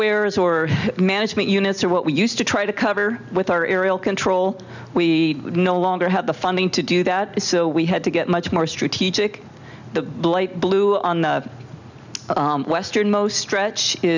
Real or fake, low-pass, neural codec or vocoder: real; 7.2 kHz; none